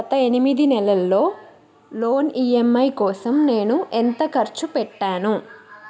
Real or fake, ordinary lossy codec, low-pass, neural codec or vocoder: real; none; none; none